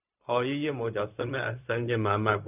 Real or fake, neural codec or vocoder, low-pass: fake; codec, 16 kHz, 0.4 kbps, LongCat-Audio-Codec; 3.6 kHz